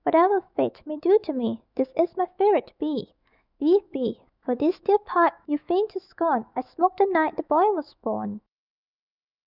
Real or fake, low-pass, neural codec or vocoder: fake; 5.4 kHz; autoencoder, 48 kHz, 128 numbers a frame, DAC-VAE, trained on Japanese speech